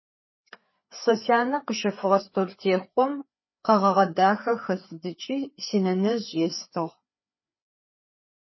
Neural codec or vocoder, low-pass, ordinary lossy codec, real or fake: codec, 16 kHz, 4 kbps, FreqCodec, larger model; 7.2 kHz; MP3, 24 kbps; fake